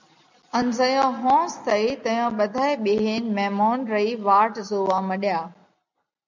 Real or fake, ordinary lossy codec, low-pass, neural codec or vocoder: real; MP3, 64 kbps; 7.2 kHz; none